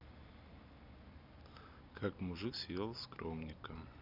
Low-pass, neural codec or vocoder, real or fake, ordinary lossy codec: 5.4 kHz; vocoder, 44.1 kHz, 128 mel bands every 256 samples, BigVGAN v2; fake; none